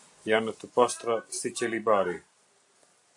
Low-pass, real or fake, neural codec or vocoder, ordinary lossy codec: 10.8 kHz; real; none; AAC, 64 kbps